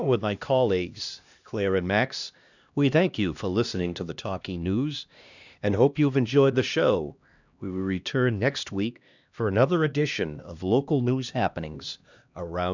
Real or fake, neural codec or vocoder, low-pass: fake; codec, 16 kHz, 1 kbps, X-Codec, HuBERT features, trained on LibriSpeech; 7.2 kHz